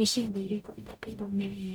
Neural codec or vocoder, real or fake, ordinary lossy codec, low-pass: codec, 44.1 kHz, 0.9 kbps, DAC; fake; none; none